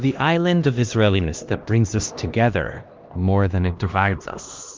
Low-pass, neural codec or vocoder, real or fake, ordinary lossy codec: 7.2 kHz; codec, 16 kHz, 1 kbps, X-Codec, HuBERT features, trained on LibriSpeech; fake; Opus, 32 kbps